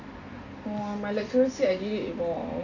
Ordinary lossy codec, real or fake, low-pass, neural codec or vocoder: none; real; 7.2 kHz; none